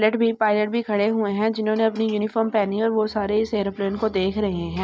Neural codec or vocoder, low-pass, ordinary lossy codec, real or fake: none; none; none; real